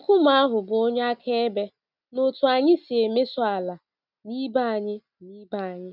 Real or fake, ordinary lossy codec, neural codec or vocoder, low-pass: real; none; none; 5.4 kHz